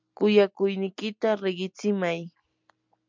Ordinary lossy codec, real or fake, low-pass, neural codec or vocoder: MP3, 64 kbps; real; 7.2 kHz; none